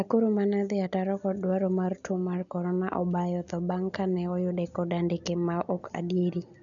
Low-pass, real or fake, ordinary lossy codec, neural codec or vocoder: 7.2 kHz; real; none; none